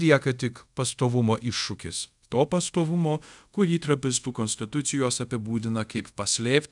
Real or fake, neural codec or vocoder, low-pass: fake; codec, 24 kHz, 0.5 kbps, DualCodec; 10.8 kHz